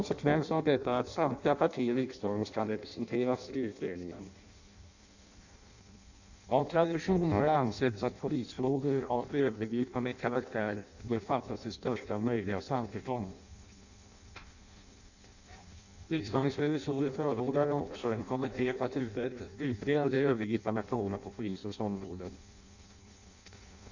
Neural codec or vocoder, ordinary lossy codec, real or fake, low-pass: codec, 16 kHz in and 24 kHz out, 0.6 kbps, FireRedTTS-2 codec; none; fake; 7.2 kHz